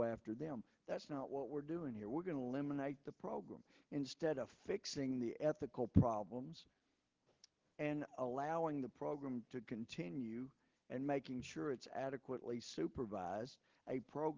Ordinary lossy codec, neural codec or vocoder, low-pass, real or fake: Opus, 16 kbps; none; 7.2 kHz; real